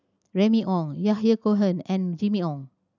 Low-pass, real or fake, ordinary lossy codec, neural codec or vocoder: 7.2 kHz; real; none; none